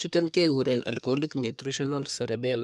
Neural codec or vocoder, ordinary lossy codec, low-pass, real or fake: codec, 24 kHz, 1 kbps, SNAC; none; none; fake